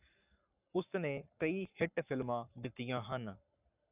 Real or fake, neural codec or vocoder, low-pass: fake; codec, 44.1 kHz, 7.8 kbps, Pupu-Codec; 3.6 kHz